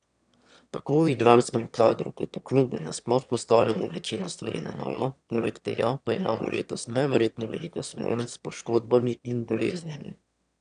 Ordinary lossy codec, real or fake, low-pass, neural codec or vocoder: none; fake; 9.9 kHz; autoencoder, 22.05 kHz, a latent of 192 numbers a frame, VITS, trained on one speaker